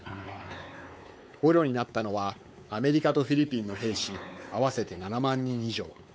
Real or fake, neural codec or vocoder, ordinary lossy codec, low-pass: fake; codec, 16 kHz, 4 kbps, X-Codec, WavLM features, trained on Multilingual LibriSpeech; none; none